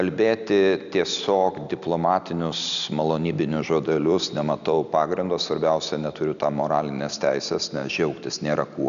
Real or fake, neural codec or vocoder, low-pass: real; none; 7.2 kHz